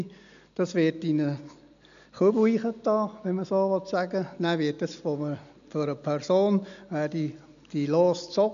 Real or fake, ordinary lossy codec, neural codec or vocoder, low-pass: real; none; none; 7.2 kHz